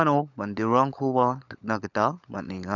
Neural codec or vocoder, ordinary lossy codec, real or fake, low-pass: codec, 16 kHz, 16 kbps, FunCodec, trained on LibriTTS, 50 frames a second; none; fake; 7.2 kHz